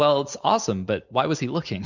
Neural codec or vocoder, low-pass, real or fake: none; 7.2 kHz; real